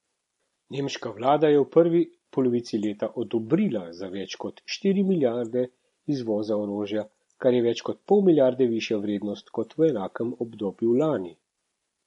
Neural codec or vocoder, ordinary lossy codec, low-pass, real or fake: none; MP3, 48 kbps; 19.8 kHz; real